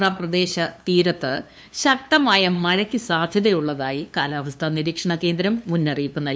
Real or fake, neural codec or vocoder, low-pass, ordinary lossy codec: fake; codec, 16 kHz, 2 kbps, FunCodec, trained on LibriTTS, 25 frames a second; none; none